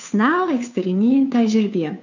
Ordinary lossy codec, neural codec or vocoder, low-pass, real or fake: none; codec, 16 kHz, 2 kbps, FunCodec, trained on Chinese and English, 25 frames a second; 7.2 kHz; fake